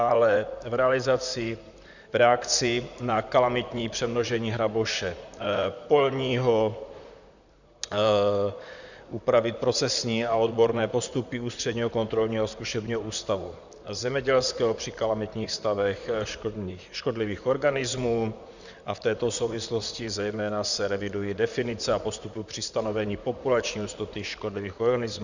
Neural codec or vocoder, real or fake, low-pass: vocoder, 44.1 kHz, 128 mel bands, Pupu-Vocoder; fake; 7.2 kHz